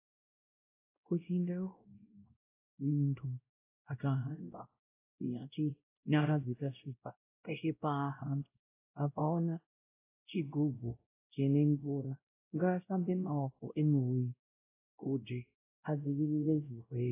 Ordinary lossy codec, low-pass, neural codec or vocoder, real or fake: AAC, 24 kbps; 3.6 kHz; codec, 16 kHz, 0.5 kbps, X-Codec, WavLM features, trained on Multilingual LibriSpeech; fake